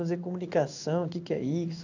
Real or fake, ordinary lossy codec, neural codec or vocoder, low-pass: fake; none; codec, 16 kHz in and 24 kHz out, 1 kbps, XY-Tokenizer; 7.2 kHz